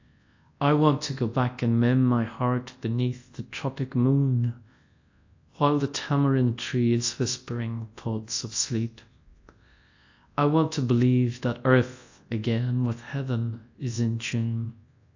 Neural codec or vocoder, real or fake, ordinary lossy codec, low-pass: codec, 24 kHz, 0.9 kbps, WavTokenizer, large speech release; fake; AAC, 48 kbps; 7.2 kHz